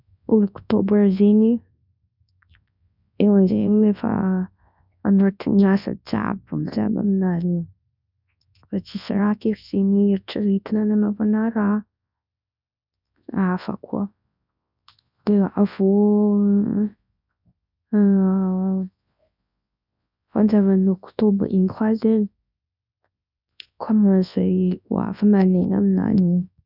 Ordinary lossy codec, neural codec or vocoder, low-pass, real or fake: none; codec, 24 kHz, 0.9 kbps, WavTokenizer, large speech release; 5.4 kHz; fake